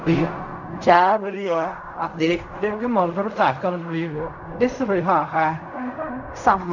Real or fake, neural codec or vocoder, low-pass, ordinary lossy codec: fake; codec, 16 kHz in and 24 kHz out, 0.4 kbps, LongCat-Audio-Codec, fine tuned four codebook decoder; 7.2 kHz; none